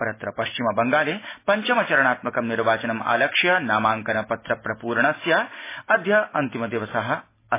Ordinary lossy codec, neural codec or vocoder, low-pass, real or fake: MP3, 16 kbps; none; 3.6 kHz; real